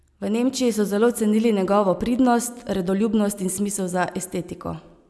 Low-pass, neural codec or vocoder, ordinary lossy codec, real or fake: none; none; none; real